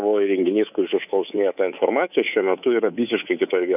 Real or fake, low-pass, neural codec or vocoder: fake; 3.6 kHz; codec, 24 kHz, 3.1 kbps, DualCodec